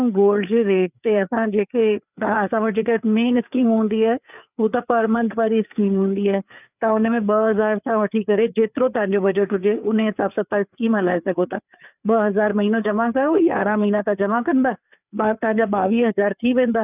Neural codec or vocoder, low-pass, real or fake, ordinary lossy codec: codec, 16 kHz, 4 kbps, FreqCodec, larger model; 3.6 kHz; fake; none